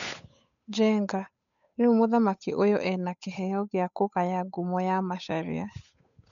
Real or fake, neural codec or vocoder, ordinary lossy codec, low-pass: fake; codec, 16 kHz, 8 kbps, FunCodec, trained on Chinese and English, 25 frames a second; none; 7.2 kHz